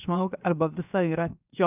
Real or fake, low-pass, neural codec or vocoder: fake; 3.6 kHz; codec, 16 kHz, 0.7 kbps, FocalCodec